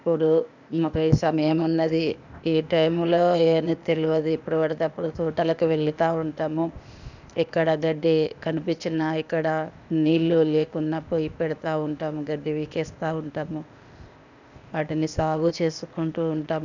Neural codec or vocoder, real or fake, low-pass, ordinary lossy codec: codec, 16 kHz, 0.8 kbps, ZipCodec; fake; 7.2 kHz; none